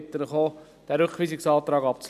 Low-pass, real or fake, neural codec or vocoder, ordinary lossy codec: 14.4 kHz; real; none; none